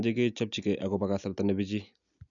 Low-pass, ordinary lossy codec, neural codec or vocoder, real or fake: 7.2 kHz; none; none; real